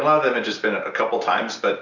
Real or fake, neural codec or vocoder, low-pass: real; none; 7.2 kHz